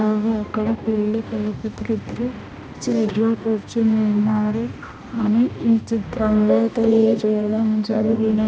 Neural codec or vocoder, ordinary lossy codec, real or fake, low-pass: codec, 16 kHz, 1 kbps, X-Codec, HuBERT features, trained on balanced general audio; none; fake; none